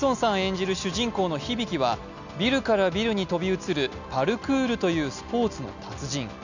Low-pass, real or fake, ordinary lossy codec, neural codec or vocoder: 7.2 kHz; real; none; none